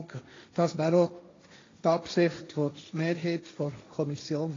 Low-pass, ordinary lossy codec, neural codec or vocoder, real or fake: 7.2 kHz; AAC, 32 kbps; codec, 16 kHz, 1.1 kbps, Voila-Tokenizer; fake